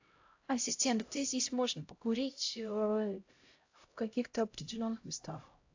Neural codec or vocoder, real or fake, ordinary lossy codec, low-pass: codec, 16 kHz, 0.5 kbps, X-Codec, HuBERT features, trained on LibriSpeech; fake; AAC, 48 kbps; 7.2 kHz